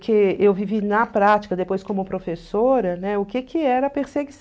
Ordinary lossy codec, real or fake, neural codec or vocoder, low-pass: none; real; none; none